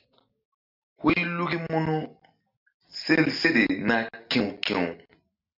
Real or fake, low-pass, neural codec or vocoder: real; 5.4 kHz; none